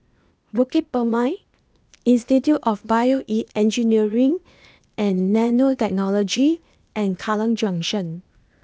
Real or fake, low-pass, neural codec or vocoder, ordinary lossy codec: fake; none; codec, 16 kHz, 0.8 kbps, ZipCodec; none